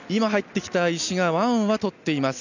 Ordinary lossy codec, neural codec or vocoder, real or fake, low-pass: none; none; real; 7.2 kHz